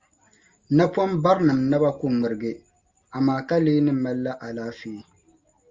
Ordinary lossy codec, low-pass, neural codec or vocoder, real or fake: Opus, 32 kbps; 7.2 kHz; none; real